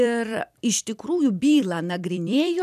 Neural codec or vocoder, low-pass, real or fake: vocoder, 44.1 kHz, 128 mel bands every 256 samples, BigVGAN v2; 14.4 kHz; fake